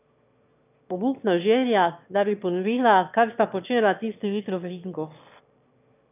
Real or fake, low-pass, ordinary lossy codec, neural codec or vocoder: fake; 3.6 kHz; none; autoencoder, 22.05 kHz, a latent of 192 numbers a frame, VITS, trained on one speaker